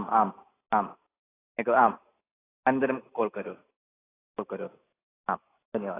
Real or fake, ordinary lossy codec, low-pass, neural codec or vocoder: real; AAC, 16 kbps; 3.6 kHz; none